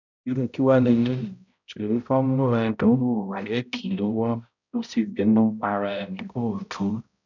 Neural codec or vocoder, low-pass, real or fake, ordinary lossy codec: codec, 16 kHz, 0.5 kbps, X-Codec, HuBERT features, trained on balanced general audio; 7.2 kHz; fake; none